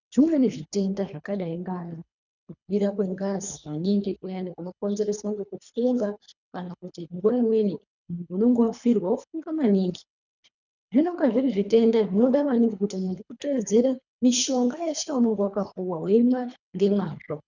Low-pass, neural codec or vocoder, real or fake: 7.2 kHz; codec, 24 kHz, 3 kbps, HILCodec; fake